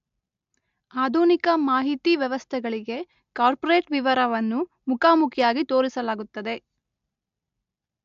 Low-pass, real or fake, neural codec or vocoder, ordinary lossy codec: 7.2 kHz; real; none; AAC, 64 kbps